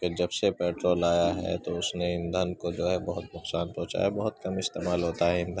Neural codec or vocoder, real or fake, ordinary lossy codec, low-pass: none; real; none; none